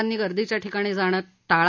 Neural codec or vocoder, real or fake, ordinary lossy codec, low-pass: none; real; none; 7.2 kHz